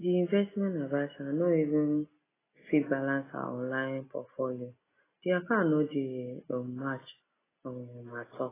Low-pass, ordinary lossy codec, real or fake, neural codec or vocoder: 3.6 kHz; AAC, 16 kbps; real; none